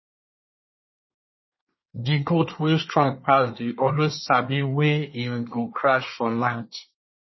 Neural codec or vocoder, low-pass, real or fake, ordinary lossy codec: codec, 24 kHz, 1 kbps, SNAC; 7.2 kHz; fake; MP3, 24 kbps